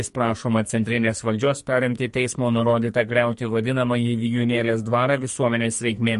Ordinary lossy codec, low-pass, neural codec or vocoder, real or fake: MP3, 48 kbps; 14.4 kHz; codec, 44.1 kHz, 2.6 kbps, SNAC; fake